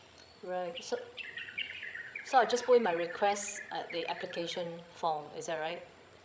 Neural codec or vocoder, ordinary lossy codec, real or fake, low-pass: codec, 16 kHz, 16 kbps, FreqCodec, larger model; none; fake; none